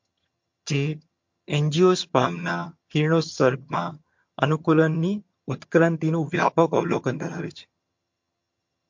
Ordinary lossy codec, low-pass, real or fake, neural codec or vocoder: MP3, 48 kbps; 7.2 kHz; fake; vocoder, 22.05 kHz, 80 mel bands, HiFi-GAN